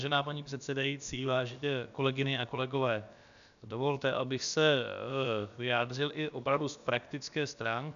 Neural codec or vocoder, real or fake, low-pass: codec, 16 kHz, about 1 kbps, DyCAST, with the encoder's durations; fake; 7.2 kHz